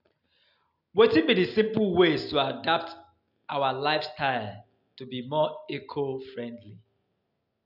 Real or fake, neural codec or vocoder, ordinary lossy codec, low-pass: real; none; none; 5.4 kHz